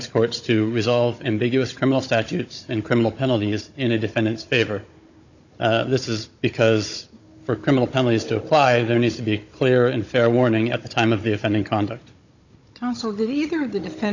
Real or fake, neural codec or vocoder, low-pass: fake; codec, 16 kHz, 16 kbps, FunCodec, trained on Chinese and English, 50 frames a second; 7.2 kHz